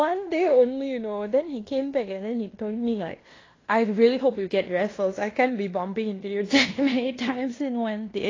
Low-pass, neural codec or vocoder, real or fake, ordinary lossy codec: 7.2 kHz; codec, 16 kHz in and 24 kHz out, 0.9 kbps, LongCat-Audio-Codec, fine tuned four codebook decoder; fake; AAC, 32 kbps